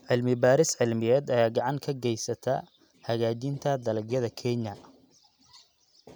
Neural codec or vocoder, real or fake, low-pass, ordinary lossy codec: none; real; none; none